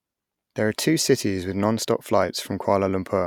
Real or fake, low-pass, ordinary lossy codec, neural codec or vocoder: real; 19.8 kHz; none; none